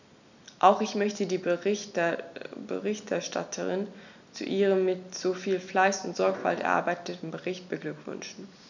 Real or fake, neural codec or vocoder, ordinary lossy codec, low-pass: real; none; none; 7.2 kHz